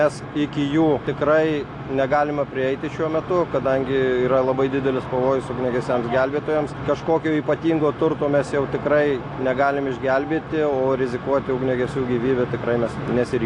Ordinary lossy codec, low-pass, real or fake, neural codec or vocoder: AAC, 48 kbps; 10.8 kHz; real; none